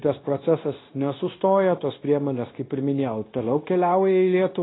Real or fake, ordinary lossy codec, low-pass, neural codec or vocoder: fake; AAC, 16 kbps; 7.2 kHz; codec, 16 kHz, 0.9 kbps, LongCat-Audio-Codec